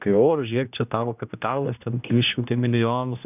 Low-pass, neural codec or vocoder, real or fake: 3.6 kHz; codec, 16 kHz, 1 kbps, X-Codec, HuBERT features, trained on general audio; fake